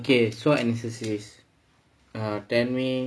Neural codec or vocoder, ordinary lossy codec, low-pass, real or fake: none; none; none; real